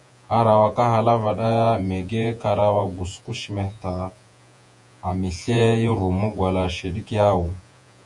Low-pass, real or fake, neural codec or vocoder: 10.8 kHz; fake; vocoder, 48 kHz, 128 mel bands, Vocos